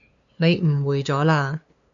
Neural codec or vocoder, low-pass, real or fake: codec, 16 kHz, 2 kbps, FunCodec, trained on LibriTTS, 25 frames a second; 7.2 kHz; fake